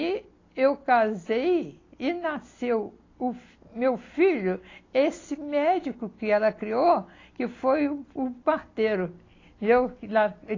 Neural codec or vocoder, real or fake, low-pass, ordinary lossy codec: none; real; 7.2 kHz; AAC, 32 kbps